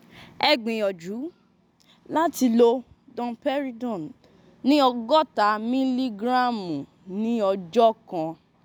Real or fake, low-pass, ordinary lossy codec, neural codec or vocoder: real; none; none; none